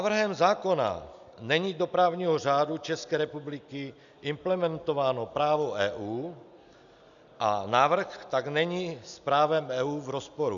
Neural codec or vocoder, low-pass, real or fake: none; 7.2 kHz; real